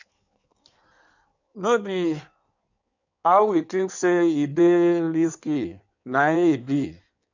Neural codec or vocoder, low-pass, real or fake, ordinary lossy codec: codec, 16 kHz in and 24 kHz out, 1.1 kbps, FireRedTTS-2 codec; 7.2 kHz; fake; none